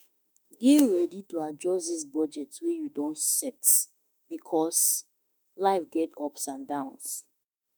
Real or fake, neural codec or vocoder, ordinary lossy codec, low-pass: fake; autoencoder, 48 kHz, 32 numbers a frame, DAC-VAE, trained on Japanese speech; none; none